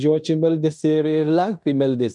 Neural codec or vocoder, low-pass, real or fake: codec, 16 kHz in and 24 kHz out, 0.9 kbps, LongCat-Audio-Codec, fine tuned four codebook decoder; 10.8 kHz; fake